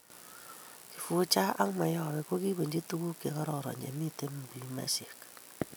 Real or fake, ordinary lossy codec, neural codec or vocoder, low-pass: real; none; none; none